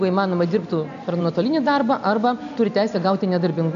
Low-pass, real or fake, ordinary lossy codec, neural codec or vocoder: 7.2 kHz; real; AAC, 96 kbps; none